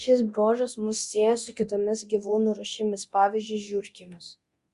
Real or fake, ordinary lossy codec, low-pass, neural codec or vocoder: fake; Opus, 64 kbps; 10.8 kHz; codec, 24 kHz, 0.9 kbps, DualCodec